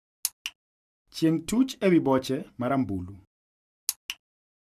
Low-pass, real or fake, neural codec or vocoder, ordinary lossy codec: 14.4 kHz; real; none; none